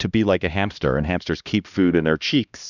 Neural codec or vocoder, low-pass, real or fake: codec, 16 kHz, 1 kbps, X-Codec, HuBERT features, trained on LibriSpeech; 7.2 kHz; fake